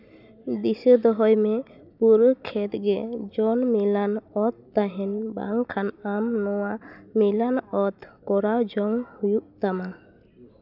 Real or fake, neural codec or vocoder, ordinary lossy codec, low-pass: real; none; none; 5.4 kHz